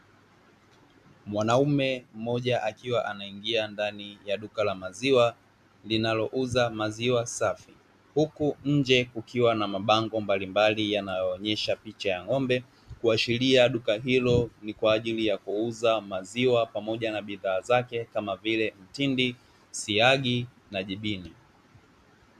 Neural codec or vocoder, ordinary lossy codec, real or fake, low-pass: none; MP3, 96 kbps; real; 14.4 kHz